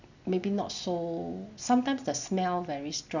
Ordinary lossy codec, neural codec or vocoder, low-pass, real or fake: none; none; 7.2 kHz; real